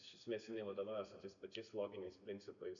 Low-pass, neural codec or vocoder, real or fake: 7.2 kHz; none; real